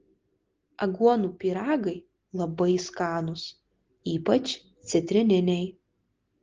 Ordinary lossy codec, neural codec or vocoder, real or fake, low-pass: Opus, 16 kbps; none; real; 7.2 kHz